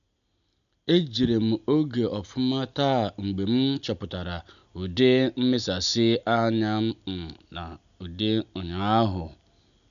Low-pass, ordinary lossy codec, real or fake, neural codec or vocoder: 7.2 kHz; none; real; none